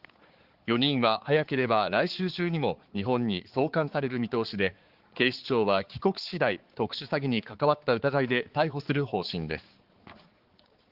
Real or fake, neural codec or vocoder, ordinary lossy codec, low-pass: fake; codec, 16 kHz, 4 kbps, X-Codec, HuBERT features, trained on balanced general audio; Opus, 16 kbps; 5.4 kHz